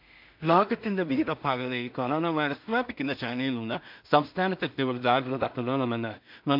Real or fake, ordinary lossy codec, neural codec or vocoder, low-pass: fake; MP3, 48 kbps; codec, 16 kHz in and 24 kHz out, 0.4 kbps, LongCat-Audio-Codec, two codebook decoder; 5.4 kHz